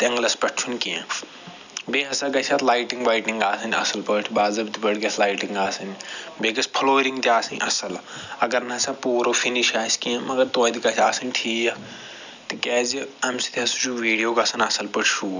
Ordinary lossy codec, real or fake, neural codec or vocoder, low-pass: none; real; none; 7.2 kHz